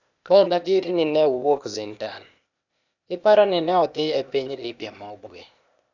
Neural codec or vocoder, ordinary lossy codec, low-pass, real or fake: codec, 16 kHz, 0.8 kbps, ZipCodec; none; 7.2 kHz; fake